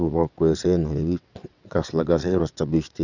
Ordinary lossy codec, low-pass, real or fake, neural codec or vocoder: none; 7.2 kHz; fake; vocoder, 22.05 kHz, 80 mel bands, WaveNeXt